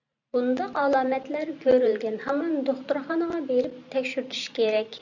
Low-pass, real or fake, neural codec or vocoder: 7.2 kHz; fake; vocoder, 44.1 kHz, 128 mel bands every 512 samples, BigVGAN v2